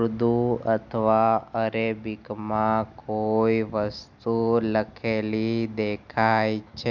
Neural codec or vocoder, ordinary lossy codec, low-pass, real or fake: none; none; 7.2 kHz; real